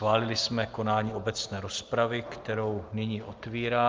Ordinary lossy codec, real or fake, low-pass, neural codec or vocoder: Opus, 32 kbps; real; 7.2 kHz; none